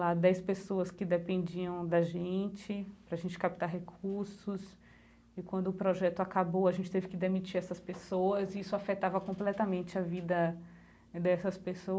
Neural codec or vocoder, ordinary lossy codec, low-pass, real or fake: none; none; none; real